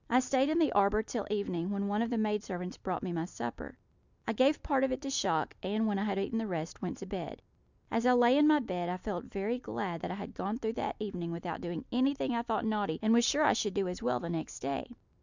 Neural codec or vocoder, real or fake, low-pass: none; real; 7.2 kHz